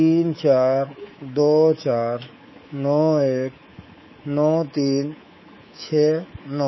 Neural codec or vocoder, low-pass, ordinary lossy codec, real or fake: codec, 24 kHz, 3.1 kbps, DualCodec; 7.2 kHz; MP3, 24 kbps; fake